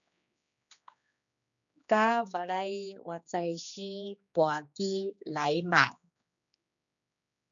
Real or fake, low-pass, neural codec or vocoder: fake; 7.2 kHz; codec, 16 kHz, 2 kbps, X-Codec, HuBERT features, trained on general audio